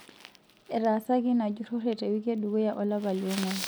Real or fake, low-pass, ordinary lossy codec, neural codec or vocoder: real; none; none; none